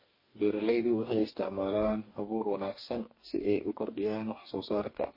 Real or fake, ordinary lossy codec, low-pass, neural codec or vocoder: fake; MP3, 24 kbps; 5.4 kHz; codec, 44.1 kHz, 2.6 kbps, DAC